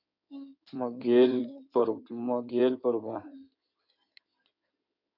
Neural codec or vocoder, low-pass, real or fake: codec, 16 kHz in and 24 kHz out, 2.2 kbps, FireRedTTS-2 codec; 5.4 kHz; fake